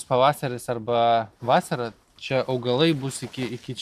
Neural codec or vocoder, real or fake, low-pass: none; real; 14.4 kHz